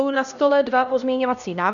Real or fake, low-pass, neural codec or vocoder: fake; 7.2 kHz; codec, 16 kHz, 1 kbps, X-Codec, HuBERT features, trained on LibriSpeech